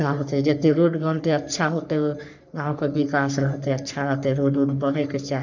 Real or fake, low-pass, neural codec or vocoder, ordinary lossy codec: fake; 7.2 kHz; codec, 44.1 kHz, 3.4 kbps, Pupu-Codec; none